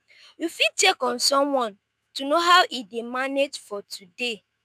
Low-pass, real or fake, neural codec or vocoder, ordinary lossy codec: 14.4 kHz; fake; autoencoder, 48 kHz, 128 numbers a frame, DAC-VAE, trained on Japanese speech; none